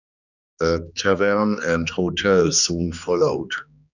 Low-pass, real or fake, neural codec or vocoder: 7.2 kHz; fake; codec, 16 kHz, 2 kbps, X-Codec, HuBERT features, trained on general audio